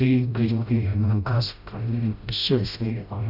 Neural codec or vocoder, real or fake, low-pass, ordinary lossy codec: codec, 16 kHz, 0.5 kbps, FreqCodec, smaller model; fake; 5.4 kHz; none